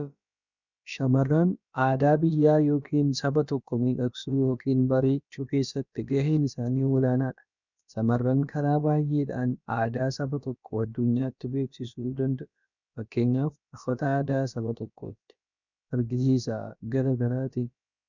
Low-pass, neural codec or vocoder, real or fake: 7.2 kHz; codec, 16 kHz, about 1 kbps, DyCAST, with the encoder's durations; fake